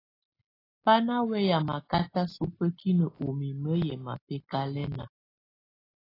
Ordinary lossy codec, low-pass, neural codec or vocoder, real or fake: AAC, 24 kbps; 5.4 kHz; none; real